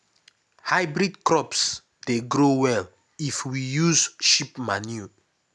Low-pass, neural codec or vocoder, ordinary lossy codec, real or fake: none; none; none; real